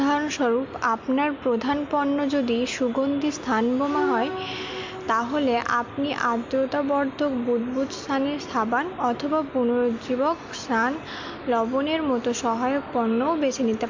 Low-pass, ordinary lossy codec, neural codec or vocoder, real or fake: 7.2 kHz; MP3, 48 kbps; none; real